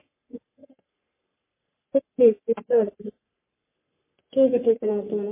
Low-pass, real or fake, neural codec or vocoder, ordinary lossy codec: 3.6 kHz; fake; codec, 44.1 kHz, 3.4 kbps, Pupu-Codec; MP3, 24 kbps